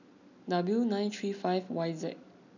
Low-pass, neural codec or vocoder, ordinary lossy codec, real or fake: 7.2 kHz; none; none; real